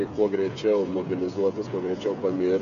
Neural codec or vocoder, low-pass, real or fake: codec, 16 kHz, 8 kbps, FreqCodec, smaller model; 7.2 kHz; fake